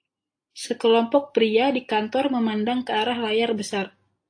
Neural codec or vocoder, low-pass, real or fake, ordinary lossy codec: none; 9.9 kHz; real; AAC, 48 kbps